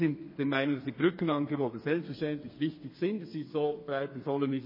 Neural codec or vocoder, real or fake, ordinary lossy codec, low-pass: codec, 32 kHz, 1.9 kbps, SNAC; fake; MP3, 24 kbps; 5.4 kHz